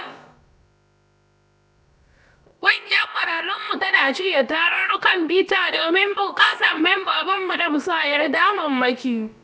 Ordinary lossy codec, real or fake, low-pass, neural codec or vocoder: none; fake; none; codec, 16 kHz, about 1 kbps, DyCAST, with the encoder's durations